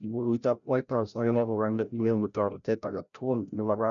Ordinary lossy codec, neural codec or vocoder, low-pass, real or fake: MP3, 96 kbps; codec, 16 kHz, 0.5 kbps, FreqCodec, larger model; 7.2 kHz; fake